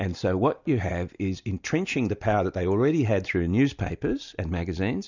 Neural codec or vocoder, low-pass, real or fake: none; 7.2 kHz; real